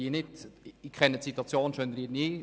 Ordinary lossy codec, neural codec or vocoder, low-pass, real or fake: none; none; none; real